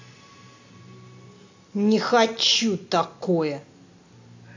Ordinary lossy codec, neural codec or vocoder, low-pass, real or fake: AAC, 48 kbps; none; 7.2 kHz; real